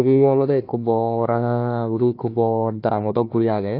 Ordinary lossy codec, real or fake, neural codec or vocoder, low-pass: AAC, 32 kbps; fake; codec, 16 kHz, 1 kbps, FunCodec, trained on Chinese and English, 50 frames a second; 5.4 kHz